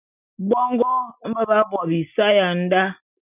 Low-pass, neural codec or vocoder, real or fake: 3.6 kHz; none; real